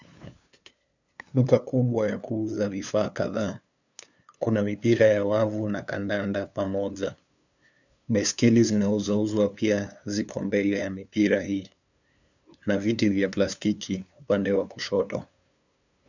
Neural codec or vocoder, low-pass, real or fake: codec, 16 kHz, 2 kbps, FunCodec, trained on LibriTTS, 25 frames a second; 7.2 kHz; fake